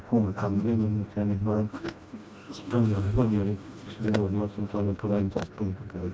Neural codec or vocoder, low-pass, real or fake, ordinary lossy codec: codec, 16 kHz, 1 kbps, FreqCodec, smaller model; none; fake; none